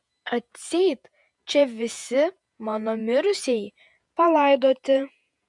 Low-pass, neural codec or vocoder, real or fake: 10.8 kHz; vocoder, 48 kHz, 128 mel bands, Vocos; fake